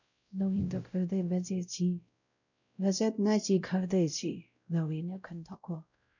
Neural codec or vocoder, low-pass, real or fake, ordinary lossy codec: codec, 16 kHz, 0.5 kbps, X-Codec, WavLM features, trained on Multilingual LibriSpeech; 7.2 kHz; fake; none